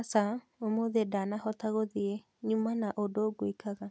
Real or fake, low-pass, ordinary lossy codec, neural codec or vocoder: real; none; none; none